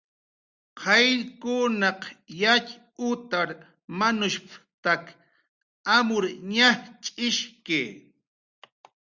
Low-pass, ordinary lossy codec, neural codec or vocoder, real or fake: 7.2 kHz; Opus, 64 kbps; none; real